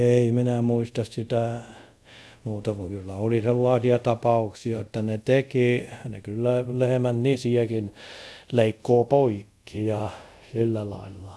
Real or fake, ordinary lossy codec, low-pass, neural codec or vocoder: fake; none; none; codec, 24 kHz, 0.5 kbps, DualCodec